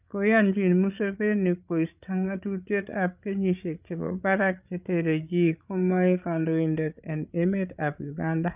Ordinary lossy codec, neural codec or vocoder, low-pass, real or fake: none; codec, 16 kHz, 8 kbps, FreqCodec, larger model; 3.6 kHz; fake